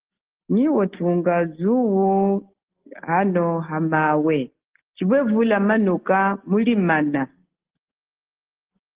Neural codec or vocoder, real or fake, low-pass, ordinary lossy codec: none; real; 3.6 kHz; Opus, 16 kbps